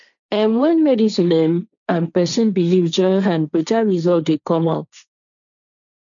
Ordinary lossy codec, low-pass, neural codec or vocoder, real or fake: none; 7.2 kHz; codec, 16 kHz, 1.1 kbps, Voila-Tokenizer; fake